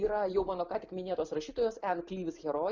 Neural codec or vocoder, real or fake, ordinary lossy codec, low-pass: none; real; Opus, 64 kbps; 7.2 kHz